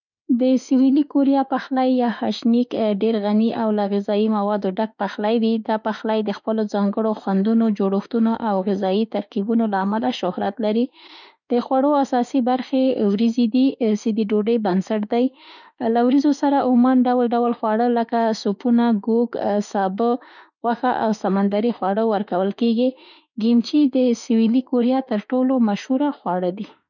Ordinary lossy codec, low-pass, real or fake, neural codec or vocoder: none; 7.2 kHz; fake; autoencoder, 48 kHz, 32 numbers a frame, DAC-VAE, trained on Japanese speech